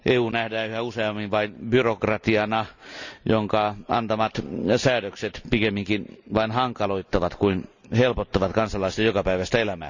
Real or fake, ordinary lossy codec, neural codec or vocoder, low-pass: real; none; none; 7.2 kHz